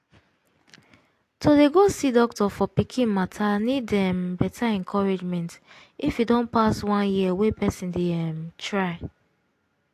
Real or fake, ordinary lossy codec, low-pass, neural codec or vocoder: real; AAC, 64 kbps; 14.4 kHz; none